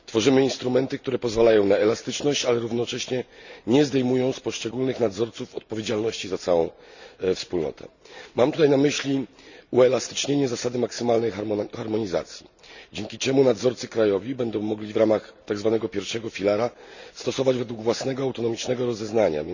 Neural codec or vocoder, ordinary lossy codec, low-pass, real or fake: none; none; 7.2 kHz; real